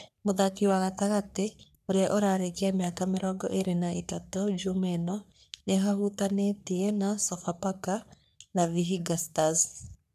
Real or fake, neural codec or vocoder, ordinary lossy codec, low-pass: fake; codec, 44.1 kHz, 3.4 kbps, Pupu-Codec; none; 14.4 kHz